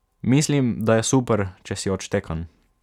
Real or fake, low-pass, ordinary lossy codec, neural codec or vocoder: real; 19.8 kHz; none; none